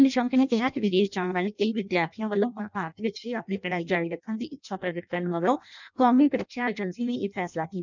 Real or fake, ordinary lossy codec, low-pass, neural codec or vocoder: fake; none; 7.2 kHz; codec, 16 kHz in and 24 kHz out, 0.6 kbps, FireRedTTS-2 codec